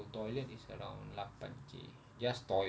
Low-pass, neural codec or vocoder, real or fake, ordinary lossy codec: none; none; real; none